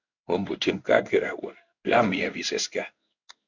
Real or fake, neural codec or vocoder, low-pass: fake; codec, 16 kHz in and 24 kHz out, 1 kbps, XY-Tokenizer; 7.2 kHz